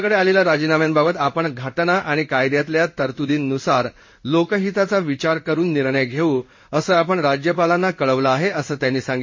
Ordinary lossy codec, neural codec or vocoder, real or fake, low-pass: MP3, 32 kbps; codec, 16 kHz in and 24 kHz out, 1 kbps, XY-Tokenizer; fake; 7.2 kHz